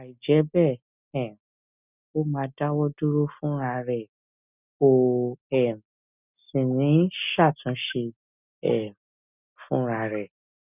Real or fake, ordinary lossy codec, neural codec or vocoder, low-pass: real; none; none; 3.6 kHz